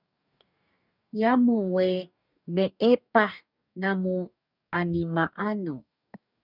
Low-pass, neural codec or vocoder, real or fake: 5.4 kHz; codec, 44.1 kHz, 2.6 kbps, DAC; fake